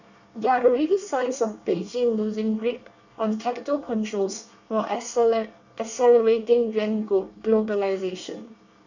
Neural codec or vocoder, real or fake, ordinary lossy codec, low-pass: codec, 24 kHz, 1 kbps, SNAC; fake; none; 7.2 kHz